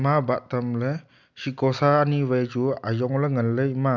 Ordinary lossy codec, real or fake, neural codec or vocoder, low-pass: none; real; none; 7.2 kHz